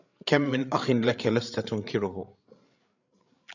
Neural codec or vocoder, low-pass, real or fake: codec, 16 kHz, 8 kbps, FreqCodec, larger model; 7.2 kHz; fake